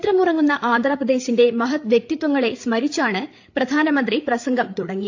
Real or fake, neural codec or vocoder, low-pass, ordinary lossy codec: fake; codec, 16 kHz, 8 kbps, FreqCodec, larger model; 7.2 kHz; AAC, 48 kbps